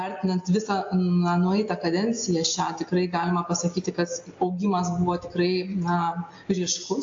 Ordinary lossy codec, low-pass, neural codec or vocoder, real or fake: AAC, 48 kbps; 7.2 kHz; none; real